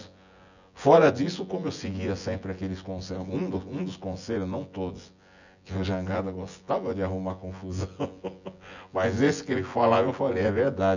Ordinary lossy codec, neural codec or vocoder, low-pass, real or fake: none; vocoder, 24 kHz, 100 mel bands, Vocos; 7.2 kHz; fake